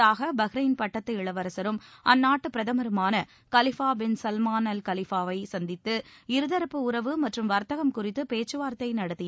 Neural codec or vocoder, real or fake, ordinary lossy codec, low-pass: none; real; none; none